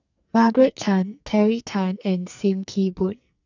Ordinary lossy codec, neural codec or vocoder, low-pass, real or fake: none; codec, 44.1 kHz, 2.6 kbps, SNAC; 7.2 kHz; fake